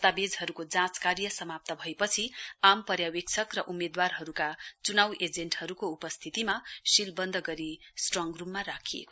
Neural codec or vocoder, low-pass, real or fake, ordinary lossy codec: none; none; real; none